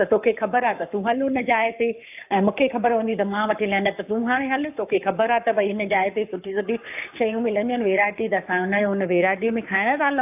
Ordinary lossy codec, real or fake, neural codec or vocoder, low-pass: AAC, 32 kbps; fake; codec, 44.1 kHz, 7.8 kbps, Pupu-Codec; 3.6 kHz